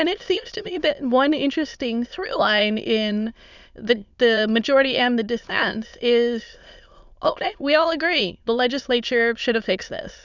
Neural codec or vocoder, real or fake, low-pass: autoencoder, 22.05 kHz, a latent of 192 numbers a frame, VITS, trained on many speakers; fake; 7.2 kHz